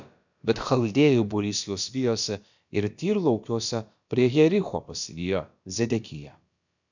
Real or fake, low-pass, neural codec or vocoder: fake; 7.2 kHz; codec, 16 kHz, about 1 kbps, DyCAST, with the encoder's durations